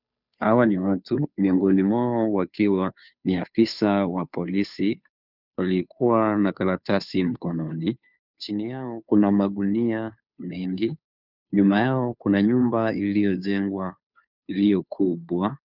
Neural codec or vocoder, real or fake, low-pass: codec, 16 kHz, 2 kbps, FunCodec, trained on Chinese and English, 25 frames a second; fake; 5.4 kHz